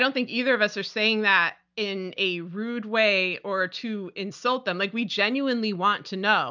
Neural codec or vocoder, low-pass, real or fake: none; 7.2 kHz; real